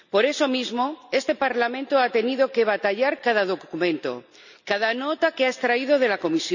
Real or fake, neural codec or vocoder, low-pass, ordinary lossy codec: real; none; 7.2 kHz; none